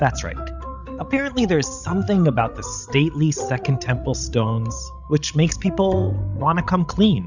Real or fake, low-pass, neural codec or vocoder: fake; 7.2 kHz; codec, 16 kHz, 16 kbps, FreqCodec, larger model